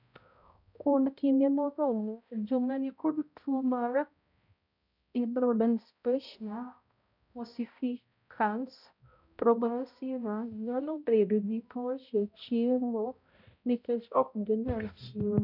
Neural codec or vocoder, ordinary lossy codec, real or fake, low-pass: codec, 16 kHz, 0.5 kbps, X-Codec, HuBERT features, trained on balanced general audio; none; fake; 5.4 kHz